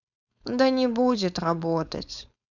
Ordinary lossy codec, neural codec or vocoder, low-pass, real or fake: none; codec, 16 kHz, 4.8 kbps, FACodec; 7.2 kHz; fake